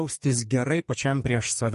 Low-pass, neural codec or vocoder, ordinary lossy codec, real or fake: 14.4 kHz; codec, 44.1 kHz, 2.6 kbps, SNAC; MP3, 48 kbps; fake